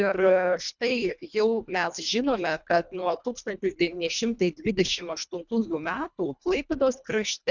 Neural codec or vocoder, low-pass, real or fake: codec, 24 kHz, 1.5 kbps, HILCodec; 7.2 kHz; fake